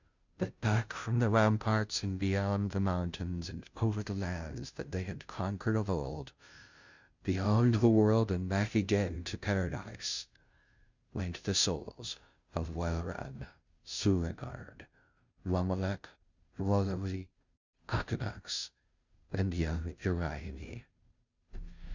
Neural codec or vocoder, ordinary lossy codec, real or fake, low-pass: codec, 16 kHz, 0.5 kbps, FunCodec, trained on Chinese and English, 25 frames a second; Opus, 64 kbps; fake; 7.2 kHz